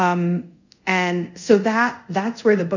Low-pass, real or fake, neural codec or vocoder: 7.2 kHz; fake; codec, 24 kHz, 0.5 kbps, DualCodec